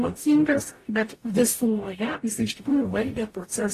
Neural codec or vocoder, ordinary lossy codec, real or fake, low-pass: codec, 44.1 kHz, 0.9 kbps, DAC; AAC, 48 kbps; fake; 14.4 kHz